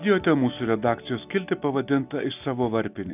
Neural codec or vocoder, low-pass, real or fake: none; 3.6 kHz; real